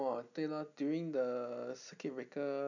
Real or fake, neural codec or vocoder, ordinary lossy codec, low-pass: real; none; none; 7.2 kHz